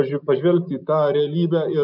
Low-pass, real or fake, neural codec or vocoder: 5.4 kHz; real; none